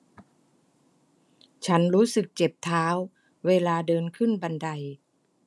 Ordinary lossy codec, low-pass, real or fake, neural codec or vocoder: none; none; real; none